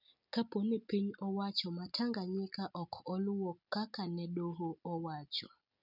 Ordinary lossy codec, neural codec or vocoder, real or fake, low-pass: none; none; real; 5.4 kHz